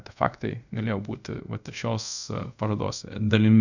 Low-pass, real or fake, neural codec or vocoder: 7.2 kHz; fake; codec, 24 kHz, 0.5 kbps, DualCodec